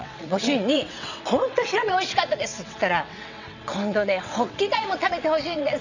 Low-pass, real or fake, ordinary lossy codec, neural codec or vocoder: 7.2 kHz; fake; none; vocoder, 22.05 kHz, 80 mel bands, WaveNeXt